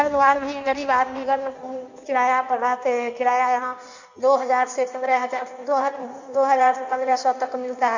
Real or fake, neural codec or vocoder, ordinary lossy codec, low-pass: fake; codec, 16 kHz in and 24 kHz out, 1.1 kbps, FireRedTTS-2 codec; none; 7.2 kHz